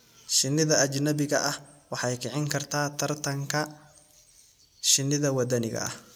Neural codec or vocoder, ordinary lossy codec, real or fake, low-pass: none; none; real; none